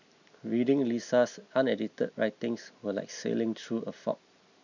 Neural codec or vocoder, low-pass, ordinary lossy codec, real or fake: none; 7.2 kHz; none; real